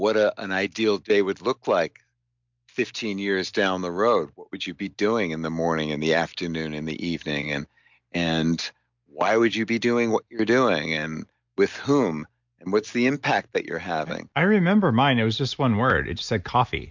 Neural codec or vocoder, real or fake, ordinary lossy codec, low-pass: none; real; MP3, 64 kbps; 7.2 kHz